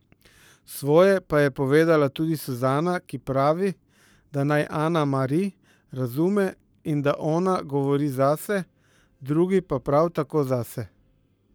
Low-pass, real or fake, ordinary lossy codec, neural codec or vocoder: none; fake; none; codec, 44.1 kHz, 7.8 kbps, Pupu-Codec